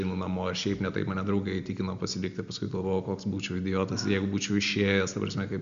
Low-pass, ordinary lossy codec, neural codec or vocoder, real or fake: 7.2 kHz; MP3, 64 kbps; none; real